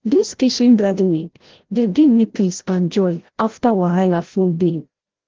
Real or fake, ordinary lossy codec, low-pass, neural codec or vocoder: fake; Opus, 16 kbps; 7.2 kHz; codec, 16 kHz, 0.5 kbps, FreqCodec, larger model